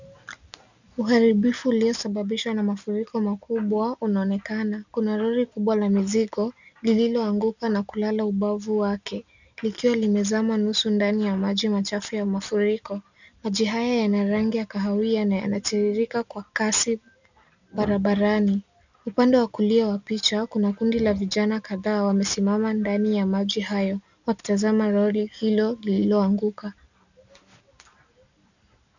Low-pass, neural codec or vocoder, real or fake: 7.2 kHz; none; real